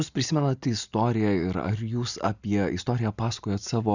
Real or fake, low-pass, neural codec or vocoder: real; 7.2 kHz; none